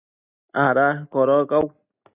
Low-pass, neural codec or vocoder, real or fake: 3.6 kHz; none; real